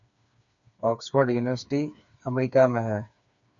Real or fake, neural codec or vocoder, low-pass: fake; codec, 16 kHz, 4 kbps, FreqCodec, smaller model; 7.2 kHz